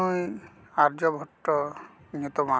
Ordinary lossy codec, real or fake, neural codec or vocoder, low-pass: none; real; none; none